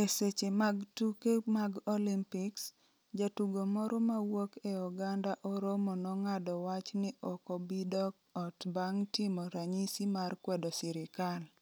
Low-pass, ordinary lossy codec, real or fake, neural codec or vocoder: none; none; real; none